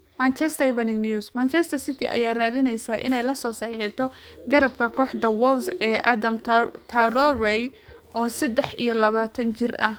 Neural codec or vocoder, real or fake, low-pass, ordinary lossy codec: codec, 44.1 kHz, 2.6 kbps, SNAC; fake; none; none